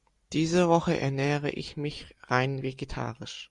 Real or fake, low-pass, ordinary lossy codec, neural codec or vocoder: real; 10.8 kHz; Opus, 64 kbps; none